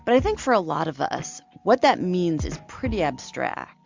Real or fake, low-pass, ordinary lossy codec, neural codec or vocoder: real; 7.2 kHz; MP3, 64 kbps; none